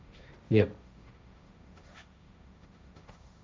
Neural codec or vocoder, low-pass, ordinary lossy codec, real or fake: codec, 16 kHz, 1.1 kbps, Voila-Tokenizer; none; none; fake